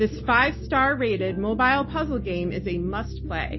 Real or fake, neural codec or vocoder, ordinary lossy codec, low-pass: real; none; MP3, 24 kbps; 7.2 kHz